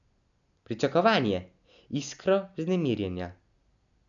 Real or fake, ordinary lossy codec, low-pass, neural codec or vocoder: real; none; 7.2 kHz; none